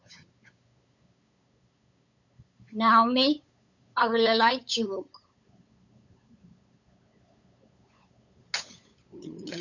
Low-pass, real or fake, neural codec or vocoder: 7.2 kHz; fake; codec, 16 kHz, 8 kbps, FunCodec, trained on LibriTTS, 25 frames a second